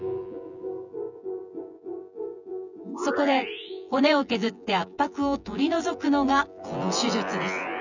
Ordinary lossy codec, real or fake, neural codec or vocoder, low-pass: none; fake; vocoder, 24 kHz, 100 mel bands, Vocos; 7.2 kHz